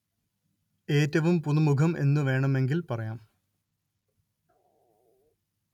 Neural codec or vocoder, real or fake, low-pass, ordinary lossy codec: none; real; 19.8 kHz; none